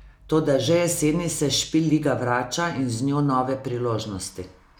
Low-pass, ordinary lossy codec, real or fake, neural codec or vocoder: none; none; real; none